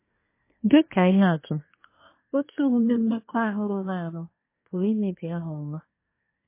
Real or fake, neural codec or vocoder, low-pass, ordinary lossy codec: fake; codec, 24 kHz, 1 kbps, SNAC; 3.6 kHz; MP3, 24 kbps